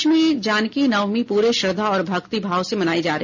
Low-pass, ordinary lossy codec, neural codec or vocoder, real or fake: 7.2 kHz; none; none; real